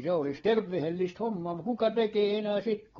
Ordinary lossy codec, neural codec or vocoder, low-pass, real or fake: AAC, 24 kbps; codec, 16 kHz, 4 kbps, FreqCodec, larger model; 7.2 kHz; fake